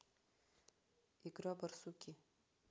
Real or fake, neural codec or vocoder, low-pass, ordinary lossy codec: real; none; none; none